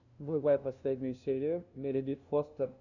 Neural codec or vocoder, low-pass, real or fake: codec, 16 kHz, 0.5 kbps, FunCodec, trained on LibriTTS, 25 frames a second; 7.2 kHz; fake